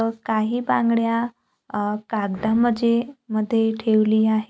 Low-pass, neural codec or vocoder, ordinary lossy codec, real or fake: none; none; none; real